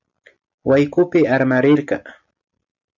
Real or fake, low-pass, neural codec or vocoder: real; 7.2 kHz; none